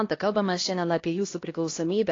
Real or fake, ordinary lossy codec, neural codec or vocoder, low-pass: fake; AAC, 32 kbps; codec, 16 kHz, 1 kbps, X-Codec, HuBERT features, trained on LibriSpeech; 7.2 kHz